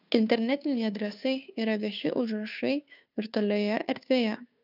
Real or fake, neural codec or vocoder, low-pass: fake; codec, 16 kHz, 2 kbps, FunCodec, trained on Chinese and English, 25 frames a second; 5.4 kHz